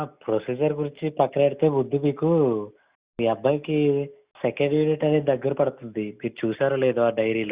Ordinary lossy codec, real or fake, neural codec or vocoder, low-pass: Opus, 64 kbps; real; none; 3.6 kHz